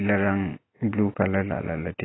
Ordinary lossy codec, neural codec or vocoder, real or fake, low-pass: AAC, 16 kbps; none; real; 7.2 kHz